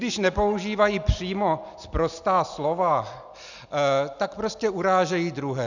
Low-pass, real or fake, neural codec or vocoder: 7.2 kHz; real; none